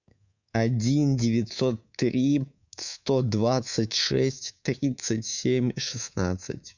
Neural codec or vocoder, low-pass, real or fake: codec, 24 kHz, 3.1 kbps, DualCodec; 7.2 kHz; fake